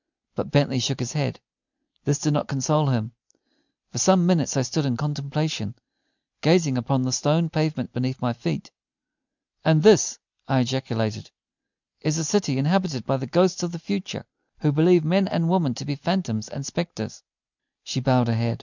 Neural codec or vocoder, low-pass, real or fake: none; 7.2 kHz; real